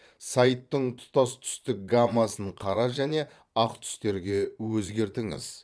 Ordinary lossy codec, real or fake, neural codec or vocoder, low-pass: none; fake; vocoder, 22.05 kHz, 80 mel bands, Vocos; none